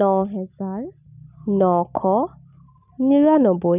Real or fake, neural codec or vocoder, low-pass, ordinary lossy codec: real; none; 3.6 kHz; none